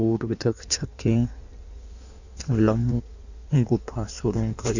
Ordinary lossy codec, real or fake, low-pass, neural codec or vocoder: none; fake; 7.2 kHz; codec, 16 kHz in and 24 kHz out, 1.1 kbps, FireRedTTS-2 codec